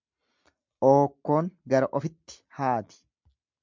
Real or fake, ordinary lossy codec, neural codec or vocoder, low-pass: real; MP3, 64 kbps; none; 7.2 kHz